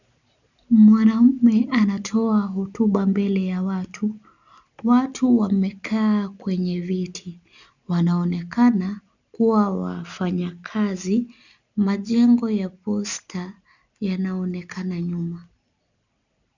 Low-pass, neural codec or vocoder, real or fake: 7.2 kHz; none; real